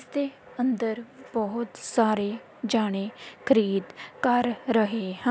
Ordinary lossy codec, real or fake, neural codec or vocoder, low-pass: none; real; none; none